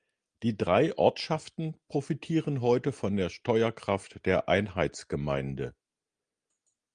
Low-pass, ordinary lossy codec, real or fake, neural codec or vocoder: 9.9 kHz; Opus, 32 kbps; real; none